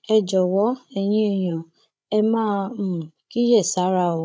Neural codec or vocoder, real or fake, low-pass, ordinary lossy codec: codec, 16 kHz, 16 kbps, FreqCodec, larger model; fake; none; none